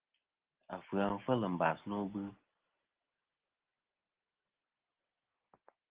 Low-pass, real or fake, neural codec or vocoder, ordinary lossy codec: 3.6 kHz; real; none; Opus, 16 kbps